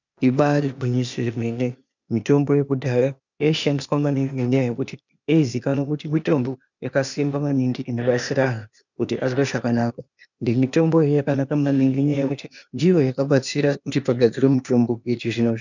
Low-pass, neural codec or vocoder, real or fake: 7.2 kHz; codec, 16 kHz, 0.8 kbps, ZipCodec; fake